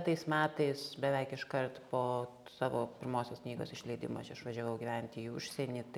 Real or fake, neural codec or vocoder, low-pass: real; none; 19.8 kHz